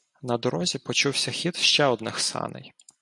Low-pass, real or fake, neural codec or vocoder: 10.8 kHz; real; none